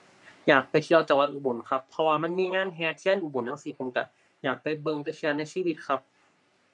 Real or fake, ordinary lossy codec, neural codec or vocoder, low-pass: fake; none; codec, 44.1 kHz, 3.4 kbps, Pupu-Codec; 10.8 kHz